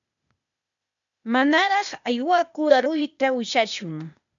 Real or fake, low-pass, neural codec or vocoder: fake; 7.2 kHz; codec, 16 kHz, 0.8 kbps, ZipCodec